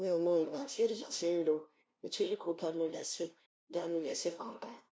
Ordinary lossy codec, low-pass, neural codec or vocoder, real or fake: none; none; codec, 16 kHz, 0.5 kbps, FunCodec, trained on LibriTTS, 25 frames a second; fake